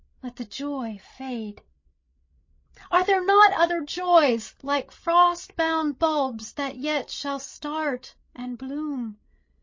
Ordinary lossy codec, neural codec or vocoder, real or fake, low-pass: MP3, 32 kbps; codec, 16 kHz, 16 kbps, FreqCodec, larger model; fake; 7.2 kHz